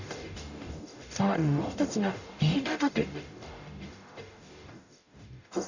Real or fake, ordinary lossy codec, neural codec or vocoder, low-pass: fake; none; codec, 44.1 kHz, 0.9 kbps, DAC; 7.2 kHz